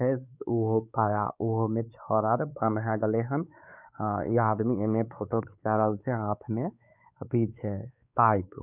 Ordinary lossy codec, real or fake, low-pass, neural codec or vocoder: none; fake; 3.6 kHz; codec, 16 kHz, 4 kbps, X-Codec, WavLM features, trained on Multilingual LibriSpeech